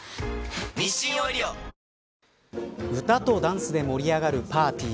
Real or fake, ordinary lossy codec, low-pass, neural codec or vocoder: real; none; none; none